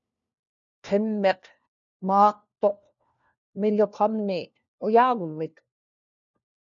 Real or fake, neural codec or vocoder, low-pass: fake; codec, 16 kHz, 1 kbps, FunCodec, trained on LibriTTS, 50 frames a second; 7.2 kHz